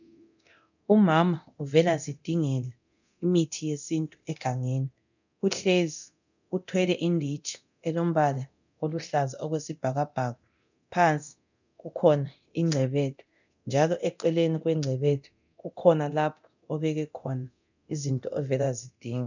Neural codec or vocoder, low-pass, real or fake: codec, 24 kHz, 0.9 kbps, DualCodec; 7.2 kHz; fake